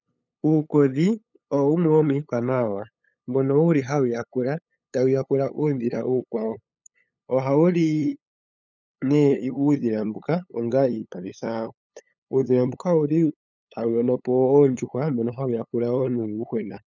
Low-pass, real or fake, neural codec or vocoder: 7.2 kHz; fake; codec, 16 kHz, 8 kbps, FunCodec, trained on LibriTTS, 25 frames a second